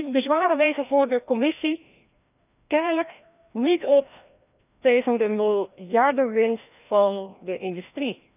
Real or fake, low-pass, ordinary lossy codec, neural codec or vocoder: fake; 3.6 kHz; none; codec, 16 kHz, 1 kbps, FreqCodec, larger model